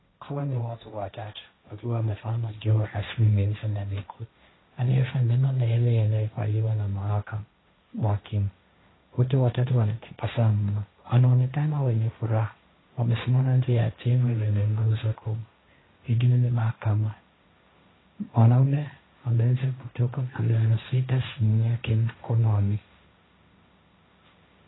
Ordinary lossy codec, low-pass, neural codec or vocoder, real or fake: AAC, 16 kbps; 7.2 kHz; codec, 16 kHz, 1.1 kbps, Voila-Tokenizer; fake